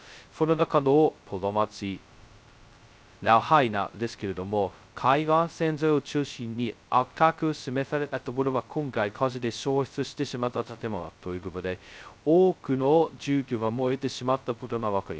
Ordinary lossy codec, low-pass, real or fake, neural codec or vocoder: none; none; fake; codec, 16 kHz, 0.2 kbps, FocalCodec